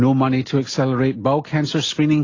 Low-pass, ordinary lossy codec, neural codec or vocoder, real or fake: 7.2 kHz; AAC, 32 kbps; none; real